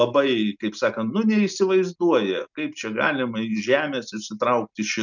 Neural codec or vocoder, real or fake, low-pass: none; real; 7.2 kHz